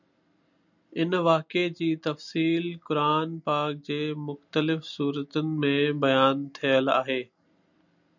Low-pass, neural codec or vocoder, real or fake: 7.2 kHz; none; real